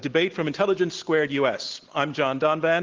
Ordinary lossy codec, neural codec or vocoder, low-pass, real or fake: Opus, 16 kbps; none; 7.2 kHz; real